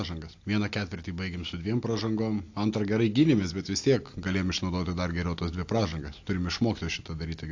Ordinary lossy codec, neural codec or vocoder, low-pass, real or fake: AAC, 48 kbps; none; 7.2 kHz; real